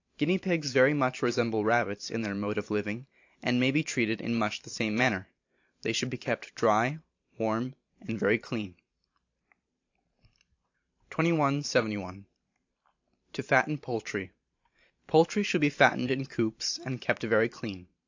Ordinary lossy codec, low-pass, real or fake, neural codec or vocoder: AAC, 48 kbps; 7.2 kHz; fake; vocoder, 44.1 kHz, 128 mel bands every 512 samples, BigVGAN v2